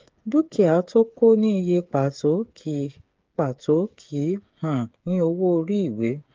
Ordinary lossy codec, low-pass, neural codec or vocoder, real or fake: Opus, 24 kbps; 7.2 kHz; codec, 16 kHz, 8 kbps, FreqCodec, smaller model; fake